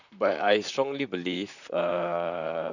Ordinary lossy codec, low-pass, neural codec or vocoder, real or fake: none; 7.2 kHz; vocoder, 44.1 kHz, 128 mel bands, Pupu-Vocoder; fake